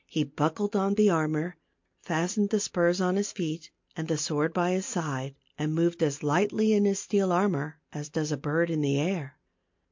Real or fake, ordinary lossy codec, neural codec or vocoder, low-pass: real; MP3, 48 kbps; none; 7.2 kHz